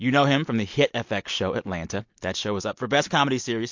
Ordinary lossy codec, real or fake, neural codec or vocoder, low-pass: MP3, 48 kbps; real; none; 7.2 kHz